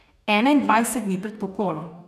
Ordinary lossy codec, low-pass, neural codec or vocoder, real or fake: none; 14.4 kHz; codec, 44.1 kHz, 2.6 kbps, DAC; fake